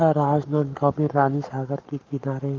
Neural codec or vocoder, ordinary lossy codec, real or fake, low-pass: codec, 44.1 kHz, 7.8 kbps, Pupu-Codec; Opus, 24 kbps; fake; 7.2 kHz